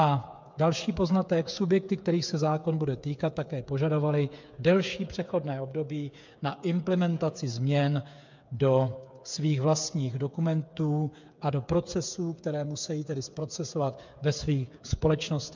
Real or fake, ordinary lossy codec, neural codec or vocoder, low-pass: fake; MP3, 64 kbps; codec, 16 kHz, 8 kbps, FreqCodec, smaller model; 7.2 kHz